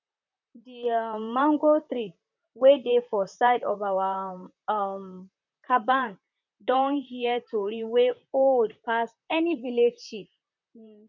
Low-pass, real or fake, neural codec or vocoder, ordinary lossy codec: 7.2 kHz; fake; vocoder, 44.1 kHz, 128 mel bands, Pupu-Vocoder; none